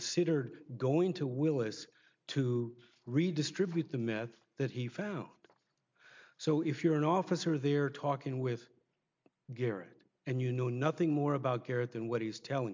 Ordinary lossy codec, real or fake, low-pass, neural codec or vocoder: MP3, 64 kbps; real; 7.2 kHz; none